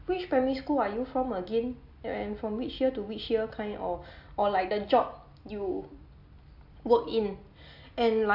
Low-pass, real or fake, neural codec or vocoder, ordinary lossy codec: 5.4 kHz; real; none; AAC, 48 kbps